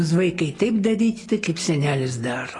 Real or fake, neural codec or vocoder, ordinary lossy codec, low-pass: real; none; AAC, 32 kbps; 10.8 kHz